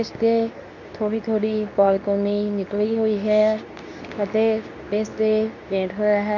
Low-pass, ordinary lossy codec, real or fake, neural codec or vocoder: 7.2 kHz; none; fake; codec, 24 kHz, 0.9 kbps, WavTokenizer, medium speech release version 2